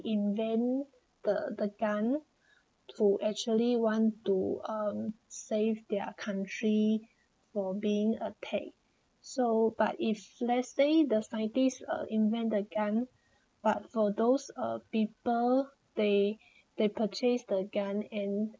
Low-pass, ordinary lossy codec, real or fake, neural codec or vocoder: none; none; real; none